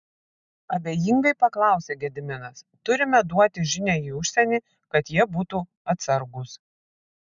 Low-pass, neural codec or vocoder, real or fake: 7.2 kHz; none; real